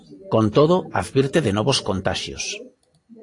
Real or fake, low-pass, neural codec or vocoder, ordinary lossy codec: real; 10.8 kHz; none; AAC, 48 kbps